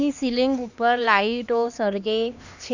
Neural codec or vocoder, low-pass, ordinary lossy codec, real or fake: codec, 16 kHz, 2 kbps, X-Codec, HuBERT features, trained on LibriSpeech; 7.2 kHz; none; fake